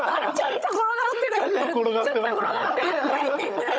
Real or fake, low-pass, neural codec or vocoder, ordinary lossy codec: fake; none; codec, 16 kHz, 16 kbps, FunCodec, trained on Chinese and English, 50 frames a second; none